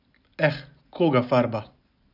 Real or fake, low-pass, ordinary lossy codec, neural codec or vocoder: real; 5.4 kHz; none; none